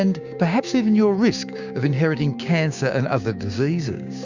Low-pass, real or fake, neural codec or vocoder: 7.2 kHz; fake; codec, 16 kHz, 6 kbps, DAC